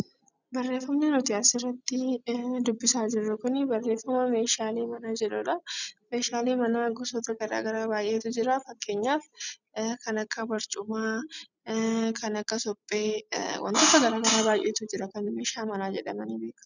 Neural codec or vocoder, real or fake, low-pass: none; real; 7.2 kHz